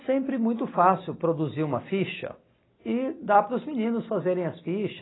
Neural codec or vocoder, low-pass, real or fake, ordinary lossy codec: none; 7.2 kHz; real; AAC, 16 kbps